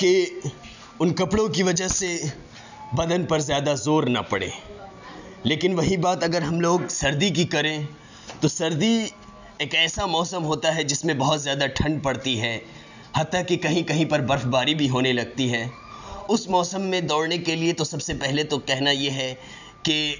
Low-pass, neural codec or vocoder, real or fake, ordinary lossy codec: 7.2 kHz; none; real; none